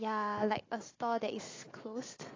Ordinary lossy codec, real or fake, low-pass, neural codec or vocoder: AAC, 32 kbps; real; 7.2 kHz; none